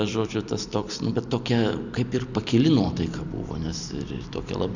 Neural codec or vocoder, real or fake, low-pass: vocoder, 44.1 kHz, 128 mel bands every 256 samples, BigVGAN v2; fake; 7.2 kHz